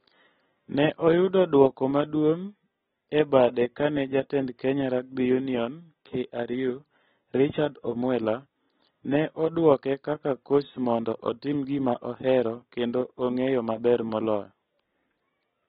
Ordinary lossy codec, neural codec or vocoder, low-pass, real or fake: AAC, 16 kbps; none; 19.8 kHz; real